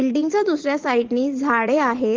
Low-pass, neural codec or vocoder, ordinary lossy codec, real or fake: 7.2 kHz; vocoder, 44.1 kHz, 80 mel bands, Vocos; Opus, 16 kbps; fake